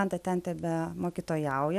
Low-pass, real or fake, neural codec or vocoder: 14.4 kHz; real; none